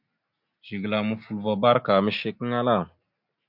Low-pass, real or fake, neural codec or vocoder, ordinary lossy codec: 5.4 kHz; real; none; MP3, 48 kbps